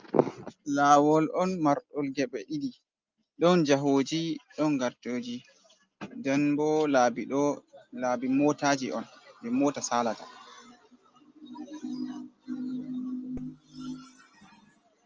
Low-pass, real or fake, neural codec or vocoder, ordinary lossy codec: 7.2 kHz; real; none; Opus, 24 kbps